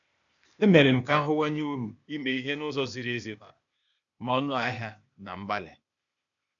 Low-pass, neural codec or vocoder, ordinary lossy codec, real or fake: 7.2 kHz; codec, 16 kHz, 0.8 kbps, ZipCodec; none; fake